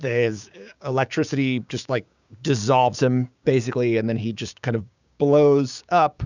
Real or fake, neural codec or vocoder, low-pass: fake; codec, 16 kHz, 6 kbps, DAC; 7.2 kHz